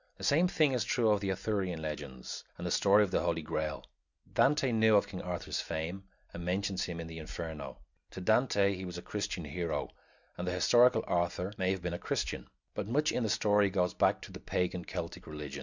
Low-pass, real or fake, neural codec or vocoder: 7.2 kHz; real; none